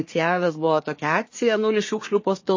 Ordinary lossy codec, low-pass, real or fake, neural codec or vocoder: MP3, 32 kbps; 7.2 kHz; fake; codec, 24 kHz, 1 kbps, SNAC